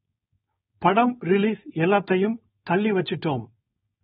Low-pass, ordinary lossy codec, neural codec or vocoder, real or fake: 7.2 kHz; AAC, 16 kbps; codec, 16 kHz, 4.8 kbps, FACodec; fake